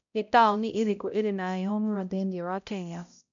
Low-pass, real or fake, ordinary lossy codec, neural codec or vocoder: 7.2 kHz; fake; none; codec, 16 kHz, 0.5 kbps, X-Codec, HuBERT features, trained on balanced general audio